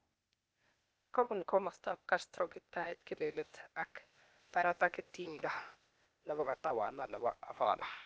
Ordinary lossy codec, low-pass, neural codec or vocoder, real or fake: none; none; codec, 16 kHz, 0.8 kbps, ZipCodec; fake